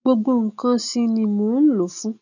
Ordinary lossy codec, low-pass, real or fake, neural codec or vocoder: none; 7.2 kHz; real; none